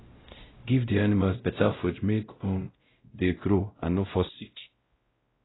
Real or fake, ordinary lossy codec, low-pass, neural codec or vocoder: fake; AAC, 16 kbps; 7.2 kHz; codec, 16 kHz, 0.5 kbps, X-Codec, WavLM features, trained on Multilingual LibriSpeech